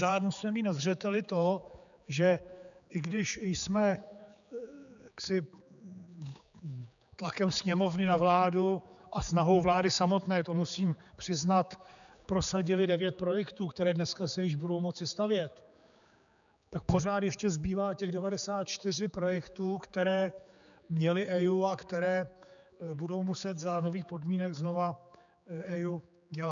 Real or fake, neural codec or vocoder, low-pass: fake; codec, 16 kHz, 4 kbps, X-Codec, HuBERT features, trained on general audio; 7.2 kHz